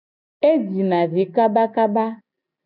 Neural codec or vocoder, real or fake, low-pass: none; real; 5.4 kHz